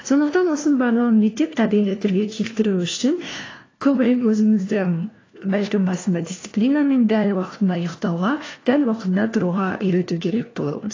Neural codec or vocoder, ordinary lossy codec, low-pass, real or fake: codec, 16 kHz, 1 kbps, FunCodec, trained on LibriTTS, 50 frames a second; AAC, 32 kbps; 7.2 kHz; fake